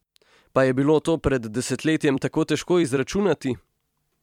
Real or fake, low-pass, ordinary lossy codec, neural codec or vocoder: fake; 19.8 kHz; MP3, 96 kbps; vocoder, 44.1 kHz, 128 mel bands every 256 samples, BigVGAN v2